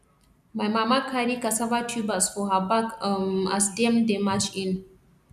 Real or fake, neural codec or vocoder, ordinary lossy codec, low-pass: real; none; none; 14.4 kHz